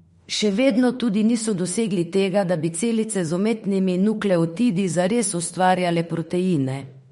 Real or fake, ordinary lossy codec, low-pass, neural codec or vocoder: fake; MP3, 48 kbps; 19.8 kHz; autoencoder, 48 kHz, 32 numbers a frame, DAC-VAE, trained on Japanese speech